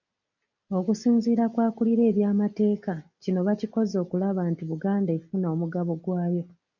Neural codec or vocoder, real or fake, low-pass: none; real; 7.2 kHz